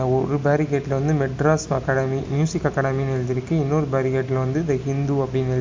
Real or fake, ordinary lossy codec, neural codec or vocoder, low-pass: real; MP3, 64 kbps; none; 7.2 kHz